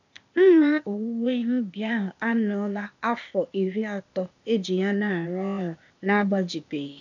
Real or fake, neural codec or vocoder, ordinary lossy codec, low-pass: fake; codec, 16 kHz, 0.8 kbps, ZipCodec; none; 7.2 kHz